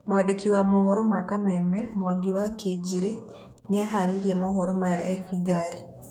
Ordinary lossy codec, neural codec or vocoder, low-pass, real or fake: none; codec, 44.1 kHz, 2.6 kbps, DAC; 19.8 kHz; fake